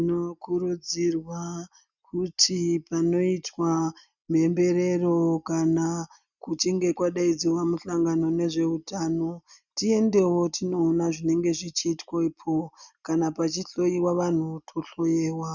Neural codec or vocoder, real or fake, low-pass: none; real; 7.2 kHz